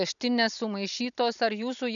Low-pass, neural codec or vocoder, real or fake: 7.2 kHz; none; real